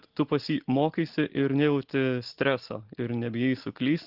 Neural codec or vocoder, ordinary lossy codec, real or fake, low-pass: none; Opus, 16 kbps; real; 5.4 kHz